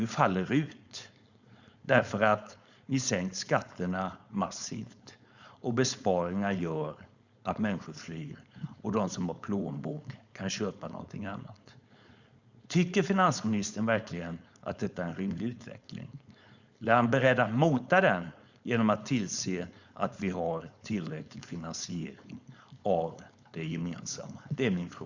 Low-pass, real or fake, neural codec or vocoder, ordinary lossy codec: 7.2 kHz; fake; codec, 16 kHz, 4.8 kbps, FACodec; Opus, 64 kbps